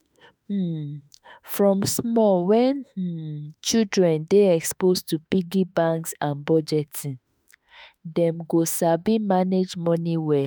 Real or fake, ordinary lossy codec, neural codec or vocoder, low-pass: fake; none; autoencoder, 48 kHz, 32 numbers a frame, DAC-VAE, trained on Japanese speech; none